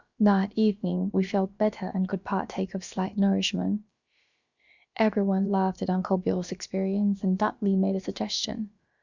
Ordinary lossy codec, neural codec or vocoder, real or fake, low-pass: Opus, 64 kbps; codec, 16 kHz, about 1 kbps, DyCAST, with the encoder's durations; fake; 7.2 kHz